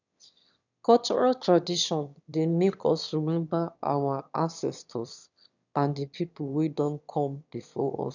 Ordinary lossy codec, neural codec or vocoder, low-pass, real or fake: none; autoencoder, 22.05 kHz, a latent of 192 numbers a frame, VITS, trained on one speaker; 7.2 kHz; fake